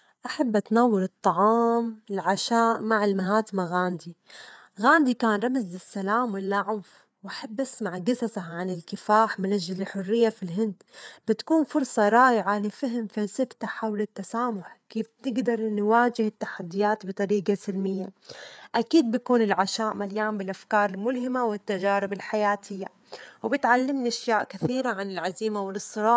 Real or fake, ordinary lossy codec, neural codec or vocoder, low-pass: fake; none; codec, 16 kHz, 4 kbps, FreqCodec, larger model; none